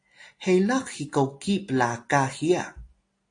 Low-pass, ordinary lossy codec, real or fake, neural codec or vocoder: 9.9 kHz; AAC, 48 kbps; real; none